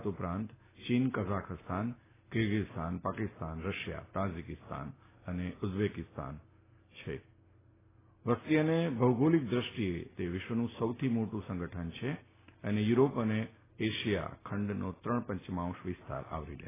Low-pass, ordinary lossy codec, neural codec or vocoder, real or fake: 3.6 kHz; AAC, 16 kbps; none; real